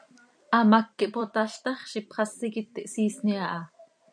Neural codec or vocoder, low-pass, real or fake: vocoder, 44.1 kHz, 128 mel bands every 256 samples, BigVGAN v2; 9.9 kHz; fake